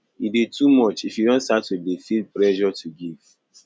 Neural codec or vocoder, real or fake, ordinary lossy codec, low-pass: none; real; none; none